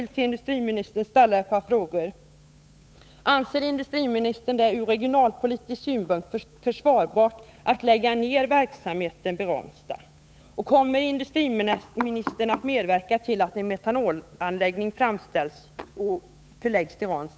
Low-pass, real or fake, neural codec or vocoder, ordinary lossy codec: none; fake; codec, 16 kHz, 8 kbps, FunCodec, trained on Chinese and English, 25 frames a second; none